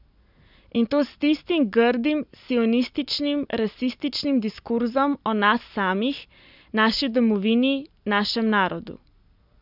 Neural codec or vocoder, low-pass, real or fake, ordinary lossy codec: none; 5.4 kHz; real; none